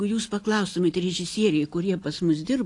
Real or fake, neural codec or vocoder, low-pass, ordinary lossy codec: real; none; 10.8 kHz; AAC, 48 kbps